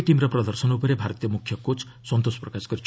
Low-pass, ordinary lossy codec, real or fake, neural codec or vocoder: none; none; real; none